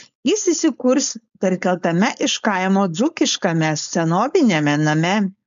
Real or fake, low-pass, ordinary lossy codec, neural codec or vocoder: fake; 7.2 kHz; MP3, 64 kbps; codec, 16 kHz, 4.8 kbps, FACodec